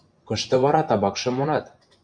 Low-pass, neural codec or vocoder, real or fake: 9.9 kHz; vocoder, 24 kHz, 100 mel bands, Vocos; fake